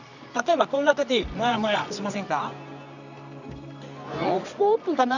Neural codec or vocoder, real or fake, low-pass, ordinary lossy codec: codec, 24 kHz, 0.9 kbps, WavTokenizer, medium music audio release; fake; 7.2 kHz; none